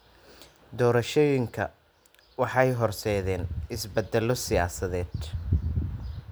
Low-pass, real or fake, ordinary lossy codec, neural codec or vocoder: none; real; none; none